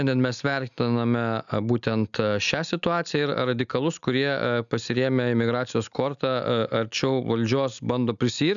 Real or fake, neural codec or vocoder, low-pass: real; none; 7.2 kHz